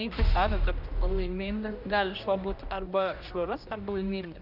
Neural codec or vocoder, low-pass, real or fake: codec, 16 kHz, 1 kbps, X-Codec, HuBERT features, trained on general audio; 5.4 kHz; fake